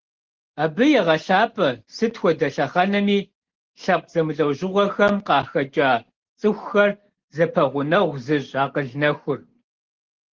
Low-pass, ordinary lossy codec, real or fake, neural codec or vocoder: 7.2 kHz; Opus, 16 kbps; real; none